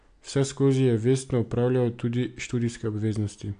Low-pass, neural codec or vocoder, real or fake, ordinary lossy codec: 9.9 kHz; none; real; AAC, 64 kbps